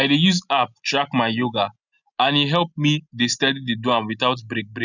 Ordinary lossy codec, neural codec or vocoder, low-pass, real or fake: none; none; 7.2 kHz; real